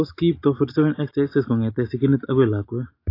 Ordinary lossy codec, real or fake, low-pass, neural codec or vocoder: AAC, 32 kbps; real; 5.4 kHz; none